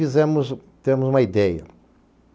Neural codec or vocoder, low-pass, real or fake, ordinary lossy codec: none; none; real; none